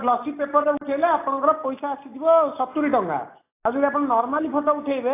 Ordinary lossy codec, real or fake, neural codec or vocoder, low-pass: AAC, 24 kbps; real; none; 3.6 kHz